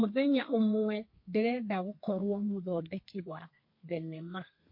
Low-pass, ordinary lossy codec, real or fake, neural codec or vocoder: 5.4 kHz; MP3, 32 kbps; fake; codec, 32 kHz, 1.9 kbps, SNAC